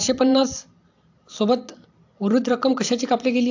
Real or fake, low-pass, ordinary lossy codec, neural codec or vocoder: fake; 7.2 kHz; AAC, 48 kbps; vocoder, 44.1 kHz, 128 mel bands every 256 samples, BigVGAN v2